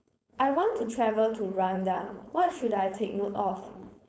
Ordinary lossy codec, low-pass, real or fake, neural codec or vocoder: none; none; fake; codec, 16 kHz, 4.8 kbps, FACodec